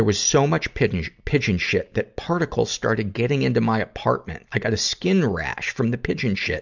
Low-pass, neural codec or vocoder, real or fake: 7.2 kHz; none; real